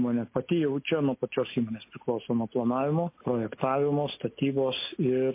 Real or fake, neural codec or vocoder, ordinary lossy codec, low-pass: real; none; MP3, 24 kbps; 3.6 kHz